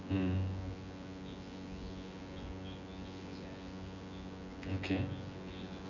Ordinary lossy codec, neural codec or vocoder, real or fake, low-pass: none; vocoder, 24 kHz, 100 mel bands, Vocos; fake; 7.2 kHz